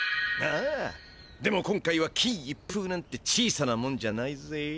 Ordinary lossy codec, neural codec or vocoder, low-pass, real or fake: none; none; none; real